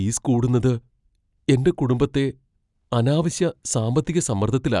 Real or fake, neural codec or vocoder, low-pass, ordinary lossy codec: real; none; 10.8 kHz; none